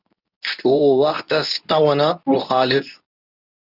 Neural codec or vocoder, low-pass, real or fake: codec, 24 kHz, 0.9 kbps, WavTokenizer, medium speech release version 2; 5.4 kHz; fake